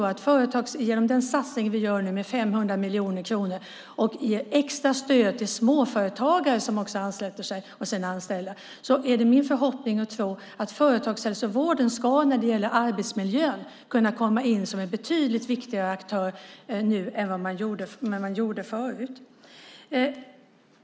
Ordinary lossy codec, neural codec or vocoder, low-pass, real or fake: none; none; none; real